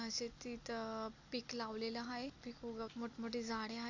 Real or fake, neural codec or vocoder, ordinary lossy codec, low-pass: real; none; AAC, 48 kbps; 7.2 kHz